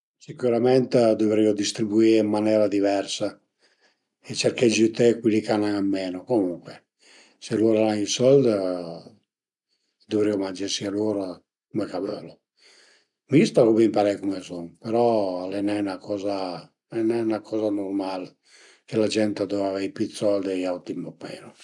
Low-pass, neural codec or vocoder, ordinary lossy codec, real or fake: 10.8 kHz; none; none; real